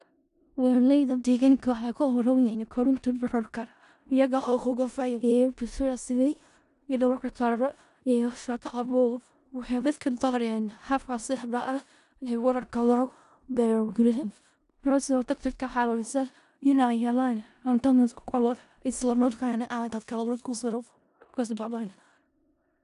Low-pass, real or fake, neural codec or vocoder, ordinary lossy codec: 10.8 kHz; fake; codec, 16 kHz in and 24 kHz out, 0.4 kbps, LongCat-Audio-Codec, four codebook decoder; none